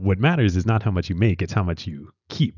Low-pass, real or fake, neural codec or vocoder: 7.2 kHz; real; none